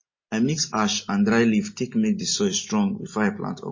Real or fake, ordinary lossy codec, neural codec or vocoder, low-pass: real; MP3, 32 kbps; none; 7.2 kHz